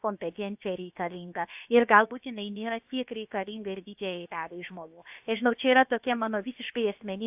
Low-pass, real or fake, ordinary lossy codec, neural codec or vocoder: 3.6 kHz; fake; AAC, 32 kbps; codec, 16 kHz, about 1 kbps, DyCAST, with the encoder's durations